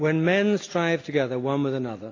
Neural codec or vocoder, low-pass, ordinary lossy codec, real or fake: none; 7.2 kHz; AAC, 32 kbps; real